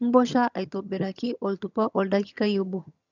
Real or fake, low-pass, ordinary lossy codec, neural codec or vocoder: fake; 7.2 kHz; none; vocoder, 22.05 kHz, 80 mel bands, HiFi-GAN